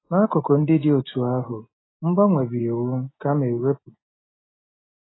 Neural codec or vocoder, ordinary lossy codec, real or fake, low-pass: none; AAC, 16 kbps; real; 7.2 kHz